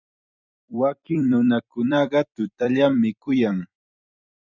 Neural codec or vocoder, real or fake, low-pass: vocoder, 44.1 kHz, 128 mel bands every 256 samples, BigVGAN v2; fake; 7.2 kHz